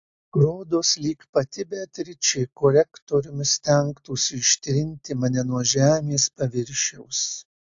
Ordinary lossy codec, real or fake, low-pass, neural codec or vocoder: AAC, 64 kbps; real; 7.2 kHz; none